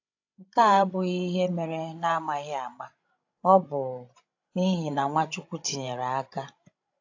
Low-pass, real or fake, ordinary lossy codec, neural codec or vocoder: 7.2 kHz; fake; AAC, 48 kbps; codec, 16 kHz, 16 kbps, FreqCodec, larger model